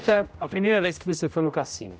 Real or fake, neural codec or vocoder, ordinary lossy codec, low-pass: fake; codec, 16 kHz, 0.5 kbps, X-Codec, HuBERT features, trained on general audio; none; none